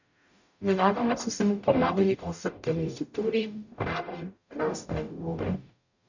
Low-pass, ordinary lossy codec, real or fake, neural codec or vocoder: 7.2 kHz; none; fake; codec, 44.1 kHz, 0.9 kbps, DAC